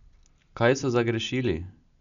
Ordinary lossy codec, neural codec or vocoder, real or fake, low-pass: MP3, 96 kbps; none; real; 7.2 kHz